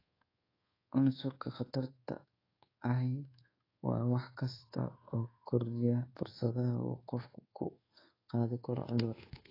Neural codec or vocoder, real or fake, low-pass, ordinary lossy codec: codec, 24 kHz, 1.2 kbps, DualCodec; fake; 5.4 kHz; AAC, 24 kbps